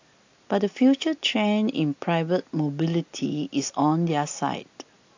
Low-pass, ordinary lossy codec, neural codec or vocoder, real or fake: 7.2 kHz; AAC, 48 kbps; none; real